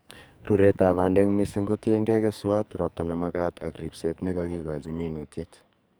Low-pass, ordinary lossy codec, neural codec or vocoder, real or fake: none; none; codec, 44.1 kHz, 2.6 kbps, SNAC; fake